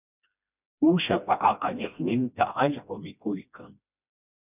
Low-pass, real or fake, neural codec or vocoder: 3.6 kHz; fake; codec, 16 kHz, 1 kbps, FreqCodec, smaller model